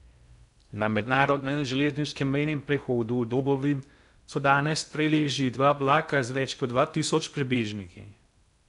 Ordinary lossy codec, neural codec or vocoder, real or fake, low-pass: none; codec, 16 kHz in and 24 kHz out, 0.6 kbps, FocalCodec, streaming, 2048 codes; fake; 10.8 kHz